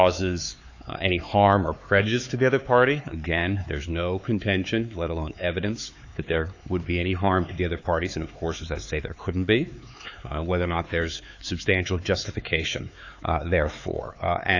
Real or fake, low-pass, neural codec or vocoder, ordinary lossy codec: fake; 7.2 kHz; codec, 16 kHz, 4 kbps, X-Codec, HuBERT features, trained on LibriSpeech; AAC, 48 kbps